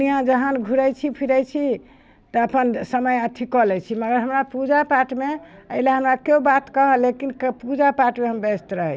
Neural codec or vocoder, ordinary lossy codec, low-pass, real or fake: none; none; none; real